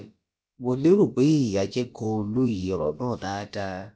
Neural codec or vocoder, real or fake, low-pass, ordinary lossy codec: codec, 16 kHz, about 1 kbps, DyCAST, with the encoder's durations; fake; none; none